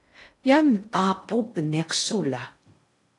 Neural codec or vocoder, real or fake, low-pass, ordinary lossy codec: codec, 16 kHz in and 24 kHz out, 0.6 kbps, FocalCodec, streaming, 2048 codes; fake; 10.8 kHz; AAC, 48 kbps